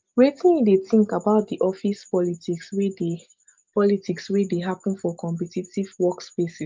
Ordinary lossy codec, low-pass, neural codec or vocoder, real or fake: Opus, 32 kbps; 7.2 kHz; none; real